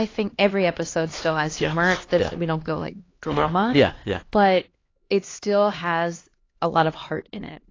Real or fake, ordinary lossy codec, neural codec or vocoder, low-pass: fake; AAC, 32 kbps; codec, 16 kHz, 2 kbps, X-Codec, HuBERT features, trained on LibriSpeech; 7.2 kHz